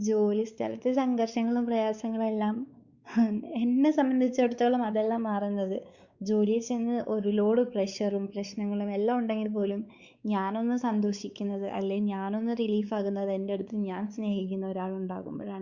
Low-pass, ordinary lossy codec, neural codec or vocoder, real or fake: 7.2 kHz; Opus, 64 kbps; codec, 16 kHz, 4 kbps, X-Codec, WavLM features, trained on Multilingual LibriSpeech; fake